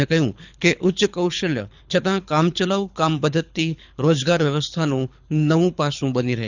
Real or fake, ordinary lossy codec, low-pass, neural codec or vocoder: fake; none; 7.2 kHz; codec, 24 kHz, 6 kbps, HILCodec